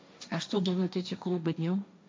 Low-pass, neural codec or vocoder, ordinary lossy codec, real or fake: none; codec, 16 kHz, 1.1 kbps, Voila-Tokenizer; none; fake